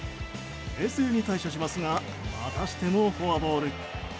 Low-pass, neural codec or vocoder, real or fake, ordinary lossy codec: none; none; real; none